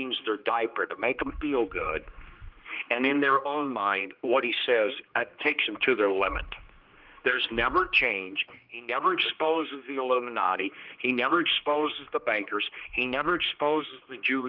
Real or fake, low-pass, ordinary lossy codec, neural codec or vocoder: fake; 5.4 kHz; Opus, 32 kbps; codec, 16 kHz, 2 kbps, X-Codec, HuBERT features, trained on general audio